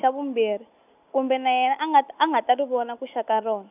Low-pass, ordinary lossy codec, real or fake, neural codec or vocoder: 3.6 kHz; none; real; none